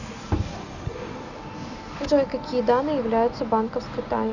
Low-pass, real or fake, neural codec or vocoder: 7.2 kHz; real; none